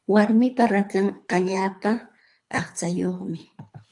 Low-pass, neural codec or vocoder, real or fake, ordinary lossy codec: 10.8 kHz; codec, 24 kHz, 3 kbps, HILCodec; fake; AAC, 64 kbps